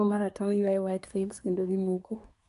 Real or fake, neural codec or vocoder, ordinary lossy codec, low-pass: fake; codec, 24 kHz, 1 kbps, SNAC; none; 10.8 kHz